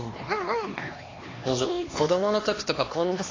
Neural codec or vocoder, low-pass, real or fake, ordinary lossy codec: codec, 16 kHz, 2 kbps, X-Codec, HuBERT features, trained on LibriSpeech; 7.2 kHz; fake; AAC, 32 kbps